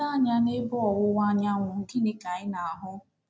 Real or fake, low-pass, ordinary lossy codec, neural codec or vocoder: real; none; none; none